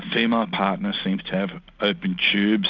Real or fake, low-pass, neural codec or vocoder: real; 7.2 kHz; none